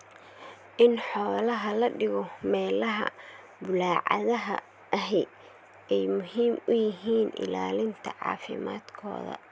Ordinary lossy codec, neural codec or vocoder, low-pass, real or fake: none; none; none; real